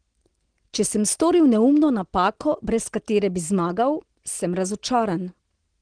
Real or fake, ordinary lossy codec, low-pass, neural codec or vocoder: real; Opus, 16 kbps; 9.9 kHz; none